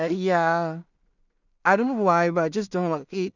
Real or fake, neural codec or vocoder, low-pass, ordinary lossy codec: fake; codec, 16 kHz in and 24 kHz out, 0.4 kbps, LongCat-Audio-Codec, two codebook decoder; 7.2 kHz; none